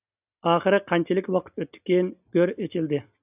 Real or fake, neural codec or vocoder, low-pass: real; none; 3.6 kHz